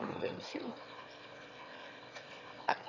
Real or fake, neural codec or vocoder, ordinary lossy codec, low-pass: fake; autoencoder, 22.05 kHz, a latent of 192 numbers a frame, VITS, trained on one speaker; none; 7.2 kHz